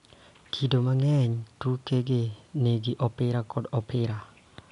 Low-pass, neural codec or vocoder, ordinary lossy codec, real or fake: 10.8 kHz; none; none; real